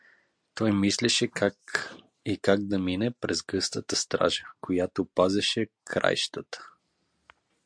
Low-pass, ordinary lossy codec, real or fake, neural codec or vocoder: 9.9 kHz; MP3, 64 kbps; real; none